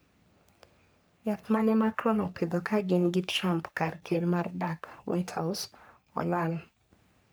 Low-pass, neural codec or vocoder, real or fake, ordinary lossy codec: none; codec, 44.1 kHz, 3.4 kbps, Pupu-Codec; fake; none